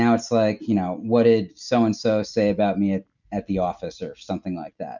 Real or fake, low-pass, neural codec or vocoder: real; 7.2 kHz; none